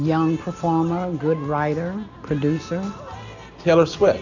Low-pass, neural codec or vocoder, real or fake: 7.2 kHz; none; real